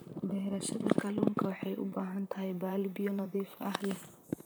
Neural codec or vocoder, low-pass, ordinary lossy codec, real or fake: vocoder, 44.1 kHz, 128 mel bands, Pupu-Vocoder; none; none; fake